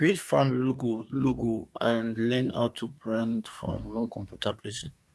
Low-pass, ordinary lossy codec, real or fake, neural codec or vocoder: none; none; fake; codec, 24 kHz, 1 kbps, SNAC